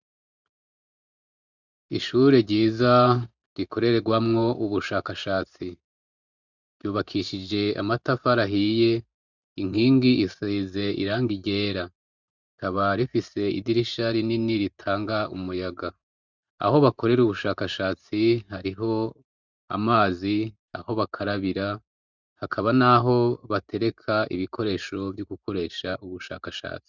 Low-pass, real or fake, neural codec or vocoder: 7.2 kHz; fake; vocoder, 44.1 kHz, 128 mel bands every 512 samples, BigVGAN v2